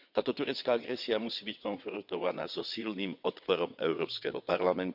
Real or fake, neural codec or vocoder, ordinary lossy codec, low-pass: fake; vocoder, 22.05 kHz, 80 mel bands, WaveNeXt; none; 5.4 kHz